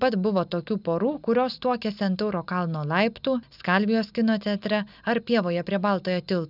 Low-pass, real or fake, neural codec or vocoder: 5.4 kHz; real; none